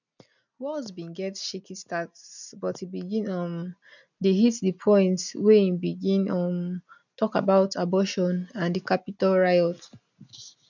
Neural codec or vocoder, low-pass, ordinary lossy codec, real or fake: none; 7.2 kHz; none; real